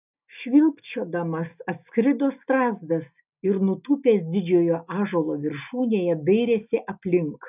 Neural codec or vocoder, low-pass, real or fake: none; 3.6 kHz; real